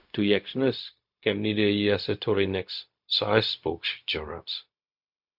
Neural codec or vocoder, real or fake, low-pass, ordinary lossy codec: codec, 16 kHz, 0.4 kbps, LongCat-Audio-Codec; fake; 5.4 kHz; MP3, 48 kbps